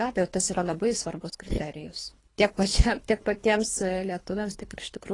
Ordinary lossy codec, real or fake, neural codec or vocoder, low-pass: AAC, 32 kbps; fake; codec, 24 kHz, 3 kbps, HILCodec; 10.8 kHz